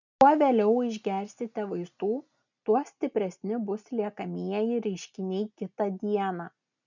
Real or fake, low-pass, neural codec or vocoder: real; 7.2 kHz; none